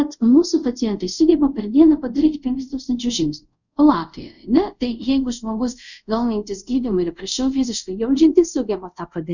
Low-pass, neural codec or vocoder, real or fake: 7.2 kHz; codec, 24 kHz, 0.5 kbps, DualCodec; fake